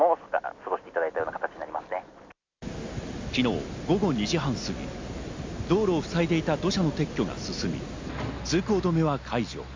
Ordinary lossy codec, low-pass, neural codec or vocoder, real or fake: MP3, 64 kbps; 7.2 kHz; none; real